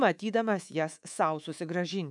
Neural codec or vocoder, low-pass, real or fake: codec, 24 kHz, 3.1 kbps, DualCodec; 10.8 kHz; fake